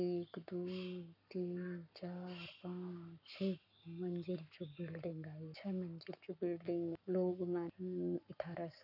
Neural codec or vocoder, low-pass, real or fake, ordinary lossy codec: codec, 44.1 kHz, 7.8 kbps, Pupu-Codec; 5.4 kHz; fake; none